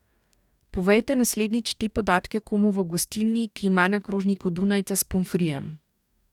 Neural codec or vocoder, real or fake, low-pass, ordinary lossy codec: codec, 44.1 kHz, 2.6 kbps, DAC; fake; 19.8 kHz; none